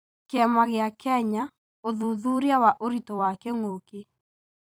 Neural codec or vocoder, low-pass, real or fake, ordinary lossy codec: vocoder, 44.1 kHz, 128 mel bands every 256 samples, BigVGAN v2; none; fake; none